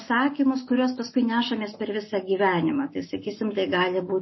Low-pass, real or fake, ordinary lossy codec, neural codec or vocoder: 7.2 kHz; real; MP3, 24 kbps; none